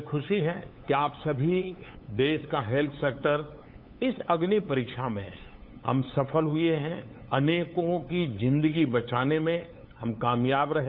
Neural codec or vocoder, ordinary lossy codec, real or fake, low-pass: codec, 16 kHz, 8 kbps, FunCodec, trained on LibriTTS, 25 frames a second; none; fake; 5.4 kHz